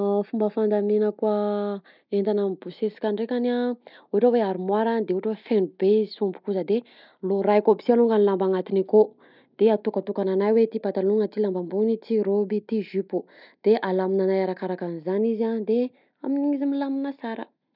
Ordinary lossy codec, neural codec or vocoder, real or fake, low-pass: none; none; real; 5.4 kHz